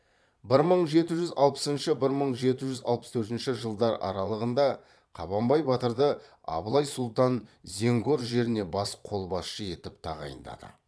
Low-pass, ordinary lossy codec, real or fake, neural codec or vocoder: none; none; fake; vocoder, 22.05 kHz, 80 mel bands, Vocos